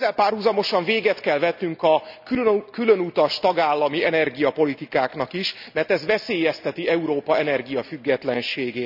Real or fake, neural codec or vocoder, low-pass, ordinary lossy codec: real; none; 5.4 kHz; none